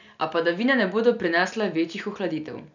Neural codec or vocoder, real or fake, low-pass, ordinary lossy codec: none; real; 7.2 kHz; none